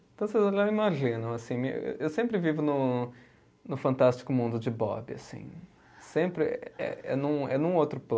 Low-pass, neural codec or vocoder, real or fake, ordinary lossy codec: none; none; real; none